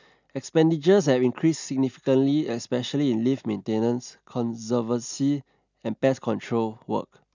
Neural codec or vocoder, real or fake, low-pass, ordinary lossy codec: none; real; 7.2 kHz; none